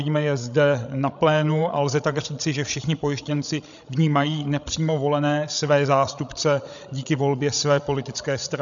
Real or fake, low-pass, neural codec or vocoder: fake; 7.2 kHz; codec, 16 kHz, 16 kbps, FreqCodec, larger model